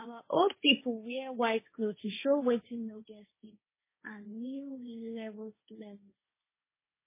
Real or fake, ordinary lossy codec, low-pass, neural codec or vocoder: fake; MP3, 16 kbps; 3.6 kHz; codec, 16 kHz, 1.1 kbps, Voila-Tokenizer